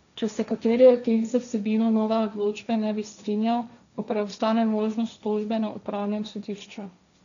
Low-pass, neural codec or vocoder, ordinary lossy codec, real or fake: 7.2 kHz; codec, 16 kHz, 1.1 kbps, Voila-Tokenizer; none; fake